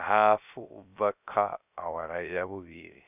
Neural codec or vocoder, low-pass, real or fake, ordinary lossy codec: codec, 16 kHz, 0.3 kbps, FocalCodec; 3.6 kHz; fake; none